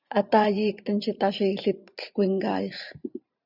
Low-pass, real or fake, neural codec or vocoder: 5.4 kHz; fake; vocoder, 44.1 kHz, 128 mel bands every 512 samples, BigVGAN v2